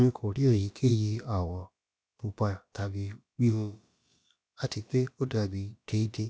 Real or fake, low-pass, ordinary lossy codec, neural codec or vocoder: fake; none; none; codec, 16 kHz, about 1 kbps, DyCAST, with the encoder's durations